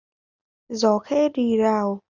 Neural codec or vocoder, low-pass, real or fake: none; 7.2 kHz; real